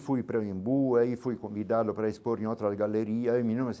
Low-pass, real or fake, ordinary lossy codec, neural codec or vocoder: none; real; none; none